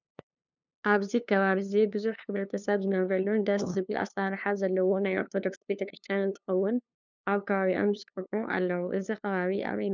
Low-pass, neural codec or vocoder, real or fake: 7.2 kHz; codec, 16 kHz, 2 kbps, FunCodec, trained on LibriTTS, 25 frames a second; fake